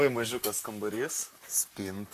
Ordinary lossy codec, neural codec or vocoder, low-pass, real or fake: AAC, 64 kbps; vocoder, 44.1 kHz, 128 mel bands, Pupu-Vocoder; 14.4 kHz; fake